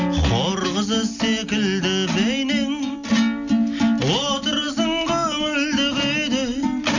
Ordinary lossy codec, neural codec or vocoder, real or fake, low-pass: none; none; real; 7.2 kHz